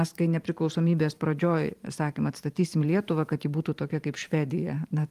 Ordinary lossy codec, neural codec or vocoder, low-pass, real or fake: Opus, 32 kbps; vocoder, 44.1 kHz, 128 mel bands every 512 samples, BigVGAN v2; 14.4 kHz; fake